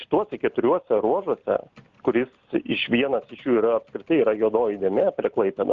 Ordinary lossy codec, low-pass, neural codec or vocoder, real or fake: Opus, 24 kbps; 7.2 kHz; none; real